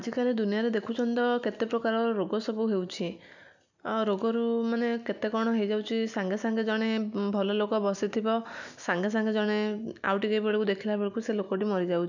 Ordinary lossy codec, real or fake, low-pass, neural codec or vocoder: none; real; 7.2 kHz; none